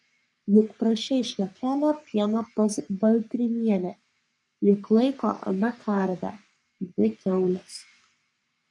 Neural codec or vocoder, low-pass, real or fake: codec, 44.1 kHz, 3.4 kbps, Pupu-Codec; 10.8 kHz; fake